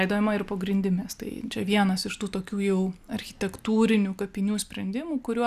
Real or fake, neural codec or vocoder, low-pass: real; none; 14.4 kHz